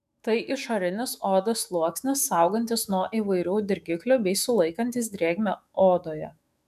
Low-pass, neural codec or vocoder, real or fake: 14.4 kHz; autoencoder, 48 kHz, 128 numbers a frame, DAC-VAE, trained on Japanese speech; fake